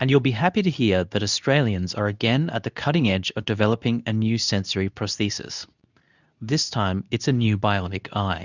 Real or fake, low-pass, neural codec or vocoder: fake; 7.2 kHz; codec, 24 kHz, 0.9 kbps, WavTokenizer, medium speech release version 2